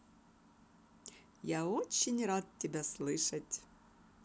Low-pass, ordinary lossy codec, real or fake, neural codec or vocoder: none; none; real; none